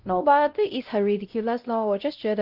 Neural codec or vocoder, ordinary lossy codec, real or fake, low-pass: codec, 16 kHz, 0.5 kbps, X-Codec, WavLM features, trained on Multilingual LibriSpeech; Opus, 24 kbps; fake; 5.4 kHz